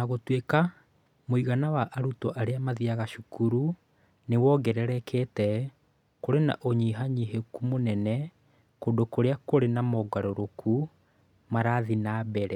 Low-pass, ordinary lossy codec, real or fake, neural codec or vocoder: 19.8 kHz; none; real; none